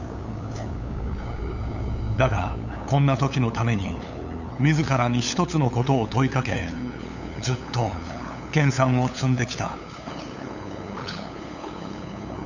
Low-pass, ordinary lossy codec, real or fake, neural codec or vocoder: 7.2 kHz; none; fake; codec, 16 kHz, 8 kbps, FunCodec, trained on LibriTTS, 25 frames a second